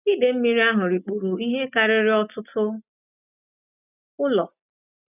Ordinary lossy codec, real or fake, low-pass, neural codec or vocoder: none; real; 3.6 kHz; none